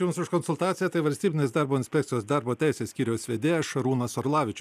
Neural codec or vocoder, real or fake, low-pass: none; real; 14.4 kHz